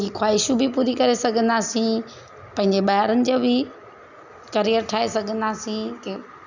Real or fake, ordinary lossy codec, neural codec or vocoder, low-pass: real; none; none; 7.2 kHz